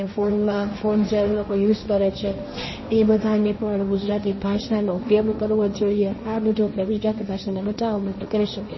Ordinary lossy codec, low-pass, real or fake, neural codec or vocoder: MP3, 24 kbps; 7.2 kHz; fake; codec, 16 kHz, 1.1 kbps, Voila-Tokenizer